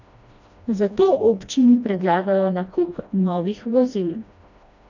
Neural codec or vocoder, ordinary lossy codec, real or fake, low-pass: codec, 16 kHz, 1 kbps, FreqCodec, smaller model; none; fake; 7.2 kHz